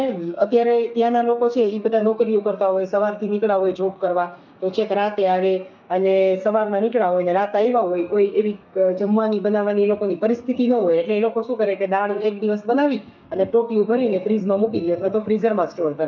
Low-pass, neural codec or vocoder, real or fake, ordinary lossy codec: 7.2 kHz; codec, 32 kHz, 1.9 kbps, SNAC; fake; none